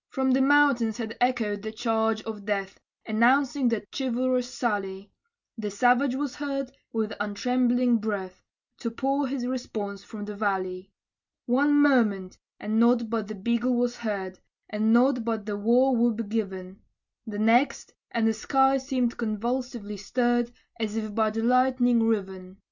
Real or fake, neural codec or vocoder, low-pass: real; none; 7.2 kHz